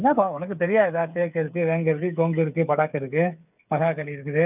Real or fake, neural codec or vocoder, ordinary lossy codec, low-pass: fake; codec, 16 kHz, 8 kbps, FreqCodec, smaller model; none; 3.6 kHz